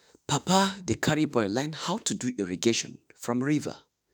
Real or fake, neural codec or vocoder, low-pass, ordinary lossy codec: fake; autoencoder, 48 kHz, 32 numbers a frame, DAC-VAE, trained on Japanese speech; none; none